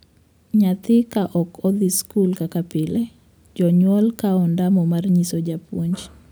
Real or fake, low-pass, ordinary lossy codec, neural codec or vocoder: real; none; none; none